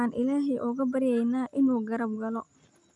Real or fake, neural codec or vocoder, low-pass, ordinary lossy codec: real; none; 10.8 kHz; none